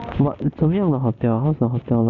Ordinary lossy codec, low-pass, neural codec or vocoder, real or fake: none; 7.2 kHz; none; real